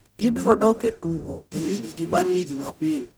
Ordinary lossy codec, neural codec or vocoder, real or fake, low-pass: none; codec, 44.1 kHz, 0.9 kbps, DAC; fake; none